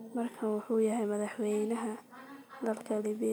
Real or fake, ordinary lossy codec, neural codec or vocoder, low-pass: real; none; none; none